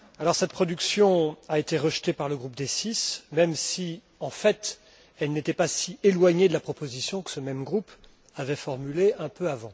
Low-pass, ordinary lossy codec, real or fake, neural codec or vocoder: none; none; real; none